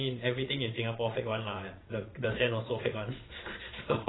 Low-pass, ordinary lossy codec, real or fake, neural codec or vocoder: 7.2 kHz; AAC, 16 kbps; fake; vocoder, 22.05 kHz, 80 mel bands, Vocos